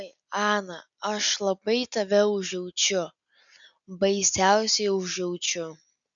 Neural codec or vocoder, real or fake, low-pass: none; real; 7.2 kHz